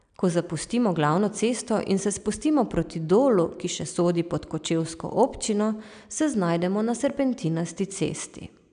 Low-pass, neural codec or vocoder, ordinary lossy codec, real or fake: 9.9 kHz; none; none; real